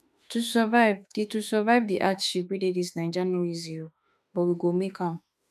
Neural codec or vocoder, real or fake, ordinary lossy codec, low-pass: autoencoder, 48 kHz, 32 numbers a frame, DAC-VAE, trained on Japanese speech; fake; none; 14.4 kHz